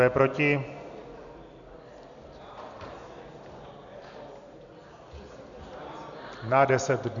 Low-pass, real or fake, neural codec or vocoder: 7.2 kHz; real; none